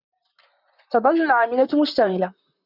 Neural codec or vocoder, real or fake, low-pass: vocoder, 44.1 kHz, 128 mel bands, Pupu-Vocoder; fake; 5.4 kHz